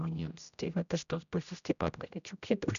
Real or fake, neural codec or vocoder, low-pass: fake; codec, 16 kHz, 0.5 kbps, X-Codec, HuBERT features, trained on general audio; 7.2 kHz